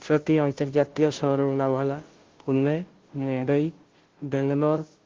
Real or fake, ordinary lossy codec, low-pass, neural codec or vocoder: fake; Opus, 16 kbps; 7.2 kHz; codec, 16 kHz, 0.5 kbps, FunCodec, trained on Chinese and English, 25 frames a second